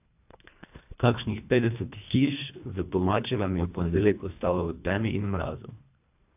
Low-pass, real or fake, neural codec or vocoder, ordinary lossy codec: 3.6 kHz; fake; codec, 24 kHz, 1.5 kbps, HILCodec; AAC, 32 kbps